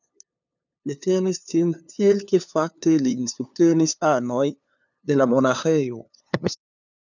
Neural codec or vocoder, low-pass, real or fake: codec, 16 kHz, 2 kbps, FunCodec, trained on LibriTTS, 25 frames a second; 7.2 kHz; fake